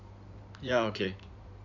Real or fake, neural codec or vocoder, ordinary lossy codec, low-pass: fake; codec, 16 kHz in and 24 kHz out, 2.2 kbps, FireRedTTS-2 codec; none; 7.2 kHz